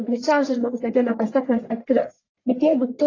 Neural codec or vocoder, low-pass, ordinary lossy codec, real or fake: codec, 44.1 kHz, 1.7 kbps, Pupu-Codec; 7.2 kHz; AAC, 32 kbps; fake